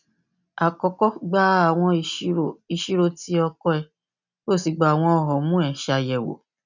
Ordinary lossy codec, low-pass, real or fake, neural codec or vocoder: none; 7.2 kHz; real; none